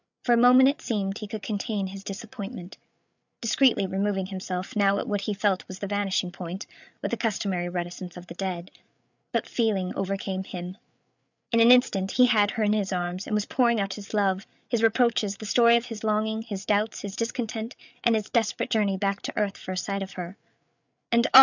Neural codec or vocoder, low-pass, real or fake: codec, 16 kHz, 8 kbps, FreqCodec, larger model; 7.2 kHz; fake